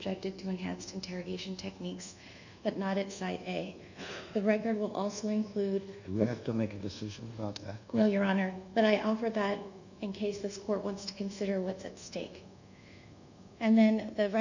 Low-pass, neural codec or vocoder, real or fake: 7.2 kHz; codec, 24 kHz, 1.2 kbps, DualCodec; fake